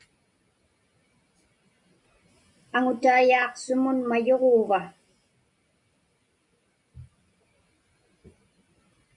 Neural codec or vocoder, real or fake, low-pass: none; real; 10.8 kHz